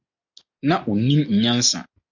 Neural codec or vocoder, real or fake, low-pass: none; real; 7.2 kHz